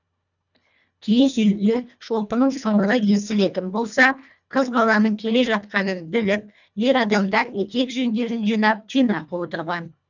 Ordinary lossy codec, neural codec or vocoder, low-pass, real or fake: none; codec, 24 kHz, 1.5 kbps, HILCodec; 7.2 kHz; fake